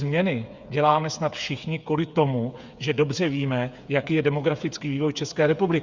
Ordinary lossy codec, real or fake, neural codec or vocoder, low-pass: Opus, 64 kbps; fake; codec, 16 kHz, 8 kbps, FreqCodec, smaller model; 7.2 kHz